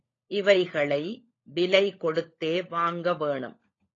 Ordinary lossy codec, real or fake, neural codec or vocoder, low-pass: AAC, 32 kbps; fake; codec, 16 kHz, 16 kbps, FunCodec, trained on LibriTTS, 50 frames a second; 7.2 kHz